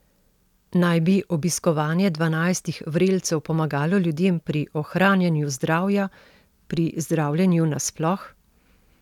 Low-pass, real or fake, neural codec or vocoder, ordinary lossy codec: 19.8 kHz; real; none; none